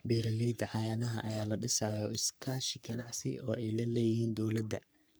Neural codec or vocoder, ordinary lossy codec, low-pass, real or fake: codec, 44.1 kHz, 3.4 kbps, Pupu-Codec; none; none; fake